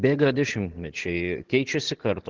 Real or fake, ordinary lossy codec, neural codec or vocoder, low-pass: real; Opus, 16 kbps; none; 7.2 kHz